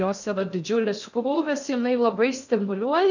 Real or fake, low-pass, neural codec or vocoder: fake; 7.2 kHz; codec, 16 kHz in and 24 kHz out, 0.6 kbps, FocalCodec, streaming, 2048 codes